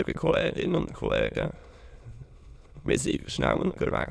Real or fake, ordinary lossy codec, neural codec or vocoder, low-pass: fake; none; autoencoder, 22.05 kHz, a latent of 192 numbers a frame, VITS, trained on many speakers; none